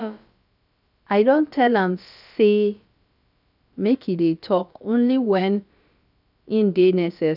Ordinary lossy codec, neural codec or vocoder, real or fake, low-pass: none; codec, 16 kHz, about 1 kbps, DyCAST, with the encoder's durations; fake; 5.4 kHz